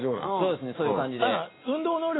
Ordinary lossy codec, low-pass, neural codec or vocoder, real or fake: AAC, 16 kbps; 7.2 kHz; none; real